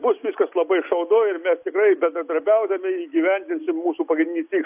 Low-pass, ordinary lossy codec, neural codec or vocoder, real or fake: 3.6 kHz; AAC, 32 kbps; none; real